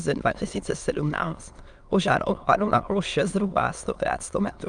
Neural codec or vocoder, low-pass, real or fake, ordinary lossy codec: autoencoder, 22.05 kHz, a latent of 192 numbers a frame, VITS, trained on many speakers; 9.9 kHz; fake; Opus, 32 kbps